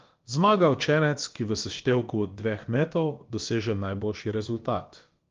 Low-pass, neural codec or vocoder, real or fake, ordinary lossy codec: 7.2 kHz; codec, 16 kHz, about 1 kbps, DyCAST, with the encoder's durations; fake; Opus, 32 kbps